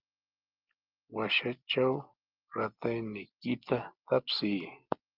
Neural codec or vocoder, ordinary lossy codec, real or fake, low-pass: none; Opus, 32 kbps; real; 5.4 kHz